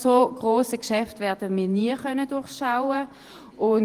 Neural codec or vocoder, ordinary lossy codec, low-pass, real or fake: vocoder, 48 kHz, 128 mel bands, Vocos; Opus, 24 kbps; 14.4 kHz; fake